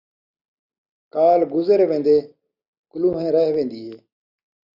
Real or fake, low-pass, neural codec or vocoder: real; 5.4 kHz; none